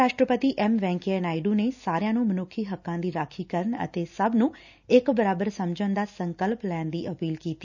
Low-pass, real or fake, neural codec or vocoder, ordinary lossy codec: 7.2 kHz; real; none; none